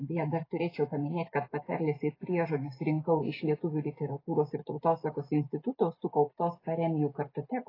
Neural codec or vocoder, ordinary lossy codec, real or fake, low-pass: none; AAC, 24 kbps; real; 5.4 kHz